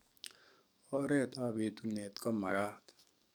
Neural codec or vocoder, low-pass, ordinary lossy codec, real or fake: codec, 44.1 kHz, 7.8 kbps, DAC; none; none; fake